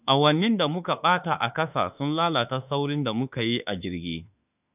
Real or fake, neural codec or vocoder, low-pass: fake; autoencoder, 48 kHz, 32 numbers a frame, DAC-VAE, trained on Japanese speech; 3.6 kHz